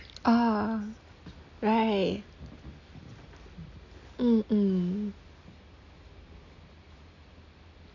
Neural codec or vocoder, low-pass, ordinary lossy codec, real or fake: none; 7.2 kHz; none; real